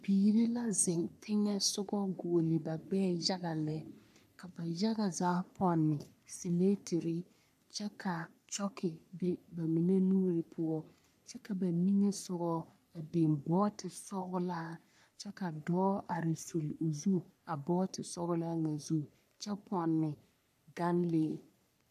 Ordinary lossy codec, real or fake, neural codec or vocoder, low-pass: AAC, 96 kbps; fake; codec, 44.1 kHz, 3.4 kbps, Pupu-Codec; 14.4 kHz